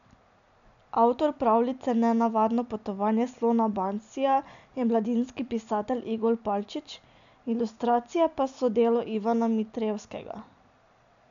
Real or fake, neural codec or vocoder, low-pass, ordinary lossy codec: real; none; 7.2 kHz; none